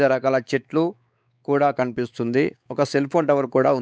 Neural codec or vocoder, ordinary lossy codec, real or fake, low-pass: codec, 16 kHz, 4 kbps, X-Codec, WavLM features, trained on Multilingual LibriSpeech; none; fake; none